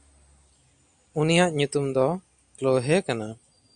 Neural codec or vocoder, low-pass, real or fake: none; 9.9 kHz; real